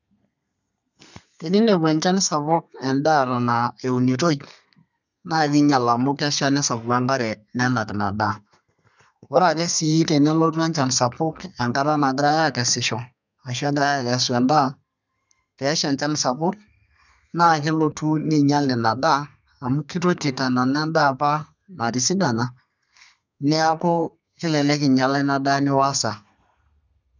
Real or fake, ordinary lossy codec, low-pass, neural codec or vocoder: fake; none; 7.2 kHz; codec, 32 kHz, 1.9 kbps, SNAC